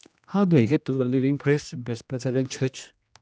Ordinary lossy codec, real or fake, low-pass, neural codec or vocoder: none; fake; none; codec, 16 kHz, 1 kbps, X-Codec, HuBERT features, trained on general audio